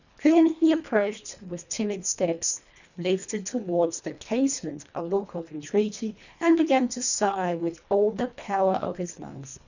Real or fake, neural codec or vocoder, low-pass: fake; codec, 24 kHz, 1.5 kbps, HILCodec; 7.2 kHz